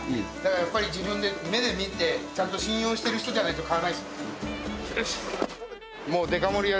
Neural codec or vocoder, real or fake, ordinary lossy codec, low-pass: none; real; none; none